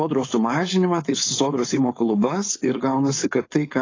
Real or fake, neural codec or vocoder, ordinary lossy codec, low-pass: fake; codec, 16 kHz, 4.8 kbps, FACodec; AAC, 32 kbps; 7.2 kHz